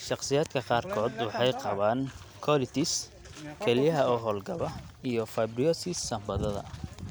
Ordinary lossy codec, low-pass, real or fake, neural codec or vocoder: none; none; real; none